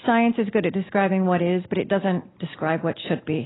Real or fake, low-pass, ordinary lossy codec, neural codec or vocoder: real; 7.2 kHz; AAC, 16 kbps; none